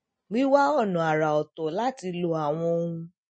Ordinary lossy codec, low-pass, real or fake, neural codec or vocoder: MP3, 32 kbps; 9.9 kHz; real; none